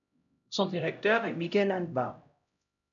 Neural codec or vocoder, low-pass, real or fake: codec, 16 kHz, 0.5 kbps, X-Codec, HuBERT features, trained on LibriSpeech; 7.2 kHz; fake